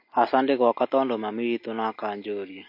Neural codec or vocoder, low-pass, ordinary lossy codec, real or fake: none; 5.4 kHz; MP3, 32 kbps; real